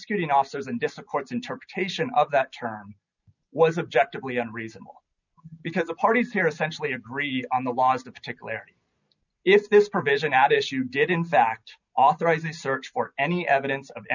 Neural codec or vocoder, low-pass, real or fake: none; 7.2 kHz; real